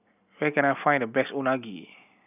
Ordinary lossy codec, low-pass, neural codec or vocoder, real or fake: none; 3.6 kHz; none; real